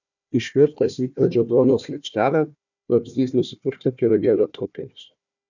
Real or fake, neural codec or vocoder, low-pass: fake; codec, 16 kHz, 1 kbps, FunCodec, trained on Chinese and English, 50 frames a second; 7.2 kHz